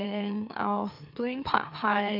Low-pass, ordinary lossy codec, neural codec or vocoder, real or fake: 5.4 kHz; none; autoencoder, 44.1 kHz, a latent of 192 numbers a frame, MeloTTS; fake